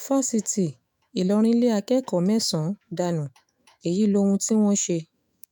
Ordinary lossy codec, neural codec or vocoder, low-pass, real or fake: none; autoencoder, 48 kHz, 128 numbers a frame, DAC-VAE, trained on Japanese speech; none; fake